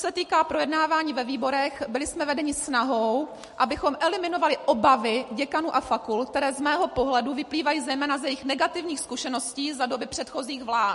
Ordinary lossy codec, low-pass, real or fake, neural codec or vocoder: MP3, 48 kbps; 14.4 kHz; real; none